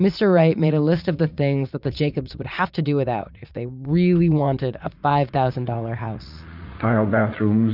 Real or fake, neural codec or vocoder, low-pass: fake; vocoder, 44.1 kHz, 80 mel bands, Vocos; 5.4 kHz